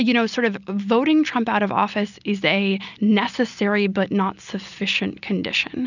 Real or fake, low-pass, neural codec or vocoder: real; 7.2 kHz; none